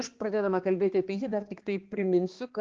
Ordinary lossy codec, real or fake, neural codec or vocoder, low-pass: Opus, 16 kbps; fake; codec, 16 kHz, 2 kbps, X-Codec, HuBERT features, trained on balanced general audio; 7.2 kHz